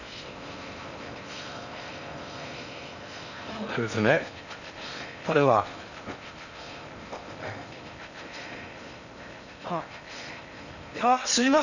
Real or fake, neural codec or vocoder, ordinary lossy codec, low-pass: fake; codec, 16 kHz in and 24 kHz out, 0.6 kbps, FocalCodec, streaming, 4096 codes; none; 7.2 kHz